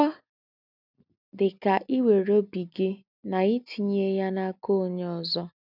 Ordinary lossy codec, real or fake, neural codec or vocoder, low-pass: none; real; none; 5.4 kHz